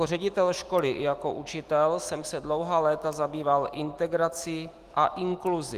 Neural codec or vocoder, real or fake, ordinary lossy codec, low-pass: autoencoder, 48 kHz, 128 numbers a frame, DAC-VAE, trained on Japanese speech; fake; Opus, 24 kbps; 14.4 kHz